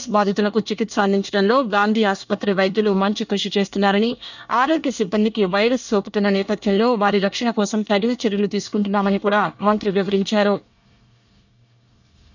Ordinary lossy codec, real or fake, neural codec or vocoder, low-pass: none; fake; codec, 24 kHz, 1 kbps, SNAC; 7.2 kHz